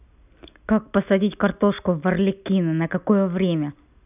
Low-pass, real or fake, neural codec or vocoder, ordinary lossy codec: 3.6 kHz; real; none; none